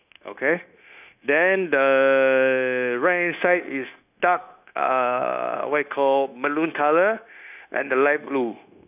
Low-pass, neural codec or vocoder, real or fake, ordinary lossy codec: 3.6 kHz; codec, 16 kHz, 0.9 kbps, LongCat-Audio-Codec; fake; none